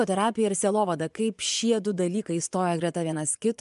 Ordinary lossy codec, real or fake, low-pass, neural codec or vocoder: MP3, 96 kbps; fake; 10.8 kHz; vocoder, 24 kHz, 100 mel bands, Vocos